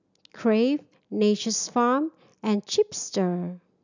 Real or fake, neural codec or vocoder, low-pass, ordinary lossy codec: real; none; 7.2 kHz; none